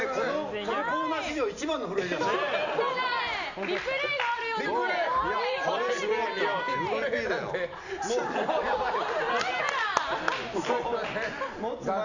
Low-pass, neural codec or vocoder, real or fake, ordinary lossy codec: 7.2 kHz; none; real; none